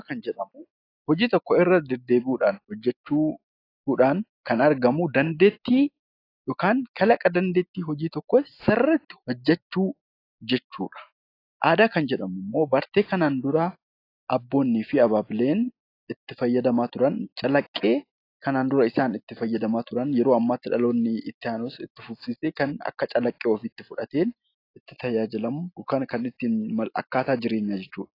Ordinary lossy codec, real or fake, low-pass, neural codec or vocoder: AAC, 32 kbps; real; 5.4 kHz; none